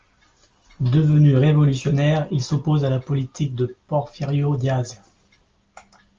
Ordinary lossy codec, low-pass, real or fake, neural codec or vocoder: Opus, 32 kbps; 7.2 kHz; real; none